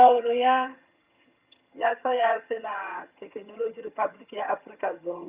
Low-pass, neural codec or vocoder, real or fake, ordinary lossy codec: 3.6 kHz; vocoder, 22.05 kHz, 80 mel bands, HiFi-GAN; fake; Opus, 64 kbps